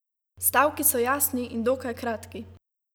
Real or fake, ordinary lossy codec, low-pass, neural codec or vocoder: real; none; none; none